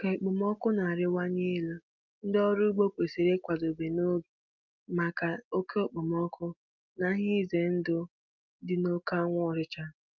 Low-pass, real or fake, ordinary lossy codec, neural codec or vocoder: 7.2 kHz; real; Opus, 24 kbps; none